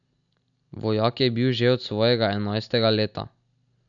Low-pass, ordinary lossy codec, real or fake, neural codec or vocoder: 7.2 kHz; none; real; none